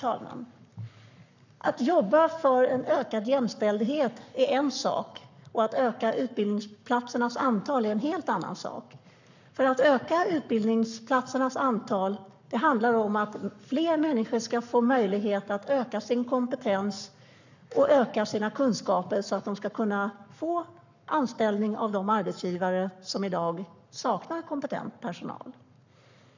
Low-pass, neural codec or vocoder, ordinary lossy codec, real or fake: 7.2 kHz; codec, 44.1 kHz, 7.8 kbps, Pupu-Codec; none; fake